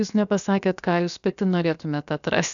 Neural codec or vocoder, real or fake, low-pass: codec, 16 kHz, about 1 kbps, DyCAST, with the encoder's durations; fake; 7.2 kHz